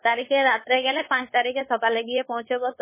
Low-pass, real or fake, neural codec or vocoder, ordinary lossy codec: 3.6 kHz; fake; codec, 16 kHz, 4.8 kbps, FACodec; MP3, 16 kbps